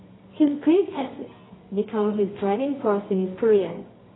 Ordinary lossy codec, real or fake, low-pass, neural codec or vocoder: AAC, 16 kbps; fake; 7.2 kHz; codec, 24 kHz, 0.9 kbps, WavTokenizer, medium music audio release